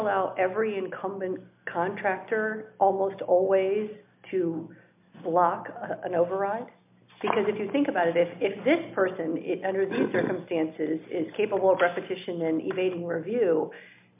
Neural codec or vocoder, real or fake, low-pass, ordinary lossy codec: none; real; 3.6 kHz; MP3, 32 kbps